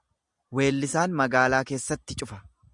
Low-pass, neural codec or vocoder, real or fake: 10.8 kHz; none; real